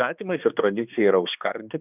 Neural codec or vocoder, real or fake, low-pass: codec, 16 kHz, 2 kbps, X-Codec, HuBERT features, trained on balanced general audio; fake; 3.6 kHz